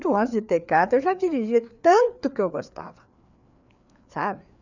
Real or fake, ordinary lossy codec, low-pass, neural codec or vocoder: fake; none; 7.2 kHz; codec, 16 kHz, 4 kbps, FreqCodec, larger model